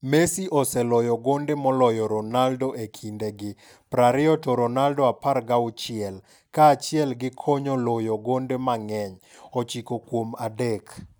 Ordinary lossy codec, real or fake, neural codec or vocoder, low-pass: none; real; none; none